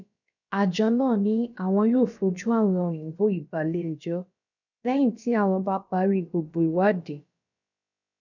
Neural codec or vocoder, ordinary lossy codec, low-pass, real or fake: codec, 16 kHz, about 1 kbps, DyCAST, with the encoder's durations; none; 7.2 kHz; fake